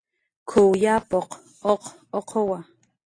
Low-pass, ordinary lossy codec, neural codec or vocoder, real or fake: 9.9 kHz; AAC, 32 kbps; none; real